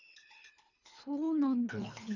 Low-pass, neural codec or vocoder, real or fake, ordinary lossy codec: 7.2 kHz; codec, 24 kHz, 3 kbps, HILCodec; fake; none